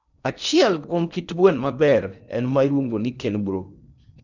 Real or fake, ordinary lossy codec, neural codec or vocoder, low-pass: fake; none; codec, 16 kHz in and 24 kHz out, 0.8 kbps, FocalCodec, streaming, 65536 codes; 7.2 kHz